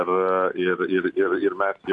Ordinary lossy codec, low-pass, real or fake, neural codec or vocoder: MP3, 96 kbps; 10.8 kHz; real; none